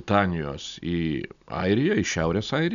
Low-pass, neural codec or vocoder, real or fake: 7.2 kHz; none; real